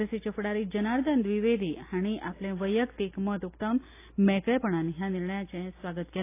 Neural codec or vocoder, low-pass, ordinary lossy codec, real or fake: none; 3.6 kHz; AAC, 24 kbps; real